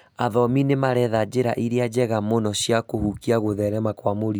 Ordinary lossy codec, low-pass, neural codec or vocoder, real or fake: none; none; none; real